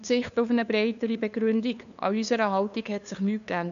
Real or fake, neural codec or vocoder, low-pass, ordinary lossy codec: fake; codec, 16 kHz, 2 kbps, FunCodec, trained on LibriTTS, 25 frames a second; 7.2 kHz; none